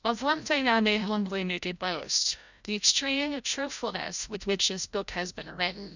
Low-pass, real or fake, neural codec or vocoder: 7.2 kHz; fake; codec, 16 kHz, 0.5 kbps, FreqCodec, larger model